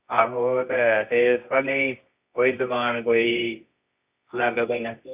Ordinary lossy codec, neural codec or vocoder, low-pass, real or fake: Opus, 64 kbps; codec, 24 kHz, 0.9 kbps, WavTokenizer, medium music audio release; 3.6 kHz; fake